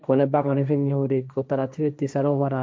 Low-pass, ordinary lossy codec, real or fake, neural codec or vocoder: none; none; fake; codec, 16 kHz, 1.1 kbps, Voila-Tokenizer